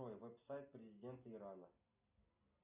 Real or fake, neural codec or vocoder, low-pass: real; none; 3.6 kHz